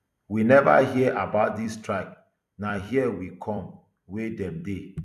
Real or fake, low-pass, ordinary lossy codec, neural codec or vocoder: fake; 14.4 kHz; none; vocoder, 44.1 kHz, 128 mel bands every 256 samples, BigVGAN v2